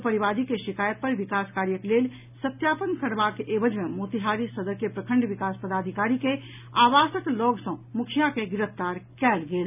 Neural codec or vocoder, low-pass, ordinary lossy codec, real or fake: none; 3.6 kHz; none; real